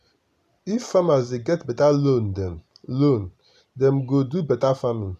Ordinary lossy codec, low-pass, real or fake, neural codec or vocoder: none; 14.4 kHz; real; none